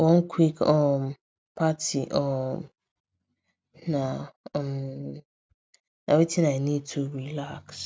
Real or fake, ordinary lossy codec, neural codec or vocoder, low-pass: real; none; none; none